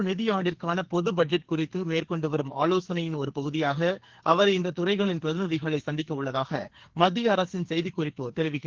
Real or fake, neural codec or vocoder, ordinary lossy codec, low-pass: fake; codec, 44.1 kHz, 2.6 kbps, SNAC; Opus, 16 kbps; 7.2 kHz